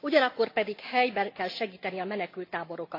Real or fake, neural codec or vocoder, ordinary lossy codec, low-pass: real; none; AAC, 32 kbps; 5.4 kHz